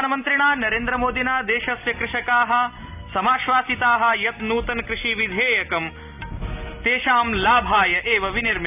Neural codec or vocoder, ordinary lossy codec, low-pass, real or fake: none; none; 3.6 kHz; real